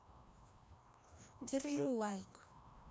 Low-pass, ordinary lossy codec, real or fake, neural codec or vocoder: none; none; fake; codec, 16 kHz, 1 kbps, FreqCodec, larger model